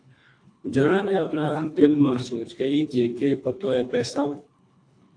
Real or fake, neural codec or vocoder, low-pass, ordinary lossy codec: fake; codec, 24 kHz, 1.5 kbps, HILCodec; 9.9 kHz; AAC, 48 kbps